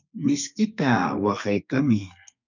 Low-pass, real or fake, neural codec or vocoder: 7.2 kHz; fake; codec, 32 kHz, 1.9 kbps, SNAC